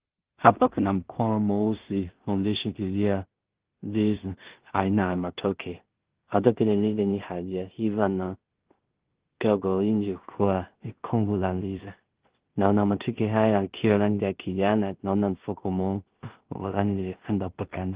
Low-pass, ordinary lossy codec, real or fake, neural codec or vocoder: 3.6 kHz; Opus, 16 kbps; fake; codec, 16 kHz in and 24 kHz out, 0.4 kbps, LongCat-Audio-Codec, two codebook decoder